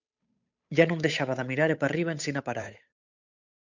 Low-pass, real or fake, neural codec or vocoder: 7.2 kHz; fake; codec, 16 kHz, 8 kbps, FunCodec, trained on Chinese and English, 25 frames a second